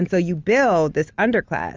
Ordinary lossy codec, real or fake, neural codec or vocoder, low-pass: Opus, 32 kbps; real; none; 7.2 kHz